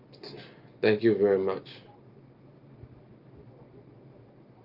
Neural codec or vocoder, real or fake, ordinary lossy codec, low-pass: none; real; Opus, 32 kbps; 5.4 kHz